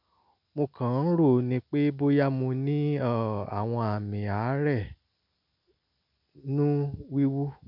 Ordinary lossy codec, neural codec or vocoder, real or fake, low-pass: none; none; real; 5.4 kHz